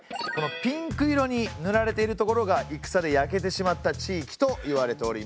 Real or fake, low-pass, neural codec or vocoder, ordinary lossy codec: real; none; none; none